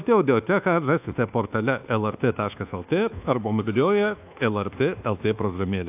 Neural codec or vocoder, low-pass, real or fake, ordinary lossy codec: codec, 24 kHz, 1.2 kbps, DualCodec; 3.6 kHz; fake; AAC, 32 kbps